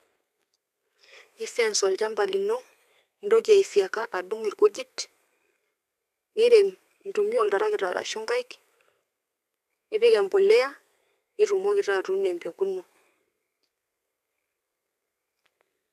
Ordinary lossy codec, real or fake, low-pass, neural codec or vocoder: none; fake; 14.4 kHz; codec, 32 kHz, 1.9 kbps, SNAC